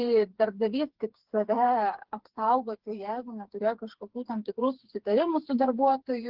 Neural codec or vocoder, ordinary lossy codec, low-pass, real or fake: codec, 16 kHz, 4 kbps, FreqCodec, smaller model; Opus, 16 kbps; 5.4 kHz; fake